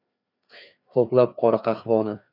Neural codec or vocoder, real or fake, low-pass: codec, 16 kHz, 2 kbps, FreqCodec, larger model; fake; 5.4 kHz